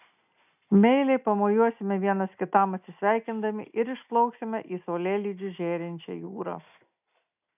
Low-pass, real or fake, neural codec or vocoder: 3.6 kHz; real; none